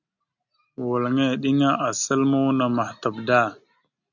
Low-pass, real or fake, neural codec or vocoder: 7.2 kHz; real; none